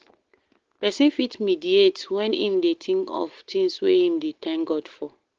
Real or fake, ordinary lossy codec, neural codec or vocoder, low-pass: real; Opus, 16 kbps; none; 7.2 kHz